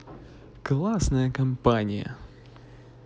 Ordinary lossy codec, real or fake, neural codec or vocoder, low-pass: none; real; none; none